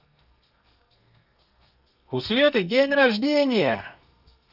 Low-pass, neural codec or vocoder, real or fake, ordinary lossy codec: 5.4 kHz; codec, 32 kHz, 1.9 kbps, SNAC; fake; none